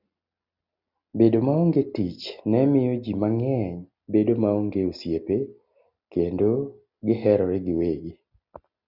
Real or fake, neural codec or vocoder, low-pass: real; none; 5.4 kHz